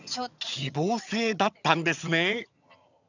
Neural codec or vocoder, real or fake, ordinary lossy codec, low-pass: vocoder, 22.05 kHz, 80 mel bands, HiFi-GAN; fake; none; 7.2 kHz